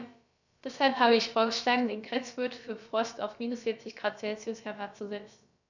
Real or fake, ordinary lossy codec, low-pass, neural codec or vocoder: fake; none; 7.2 kHz; codec, 16 kHz, about 1 kbps, DyCAST, with the encoder's durations